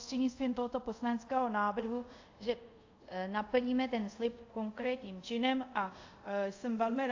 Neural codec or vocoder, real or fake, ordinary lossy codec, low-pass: codec, 24 kHz, 0.5 kbps, DualCodec; fake; AAC, 48 kbps; 7.2 kHz